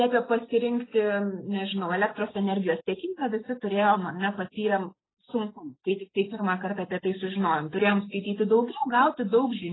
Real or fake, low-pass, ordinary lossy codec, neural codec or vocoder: real; 7.2 kHz; AAC, 16 kbps; none